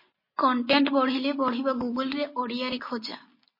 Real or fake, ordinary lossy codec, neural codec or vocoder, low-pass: real; MP3, 24 kbps; none; 5.4 kHz